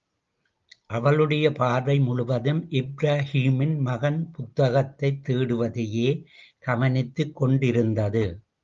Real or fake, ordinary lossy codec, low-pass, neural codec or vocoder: real; Opus, 32 kbps; 7.2 kHz; none